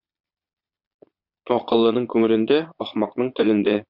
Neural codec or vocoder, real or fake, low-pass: vocoder, 22.05 kHz, 80 mel bands, Vocos; fake; 5.4 kHz